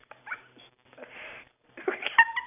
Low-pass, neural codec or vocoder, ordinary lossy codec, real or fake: 3.6 kHz; none; none; real